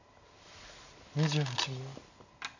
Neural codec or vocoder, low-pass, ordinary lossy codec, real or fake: none; 7.2 kHz; none; real